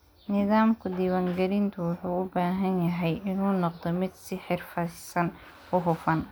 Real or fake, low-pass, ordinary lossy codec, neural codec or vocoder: real; none; none; none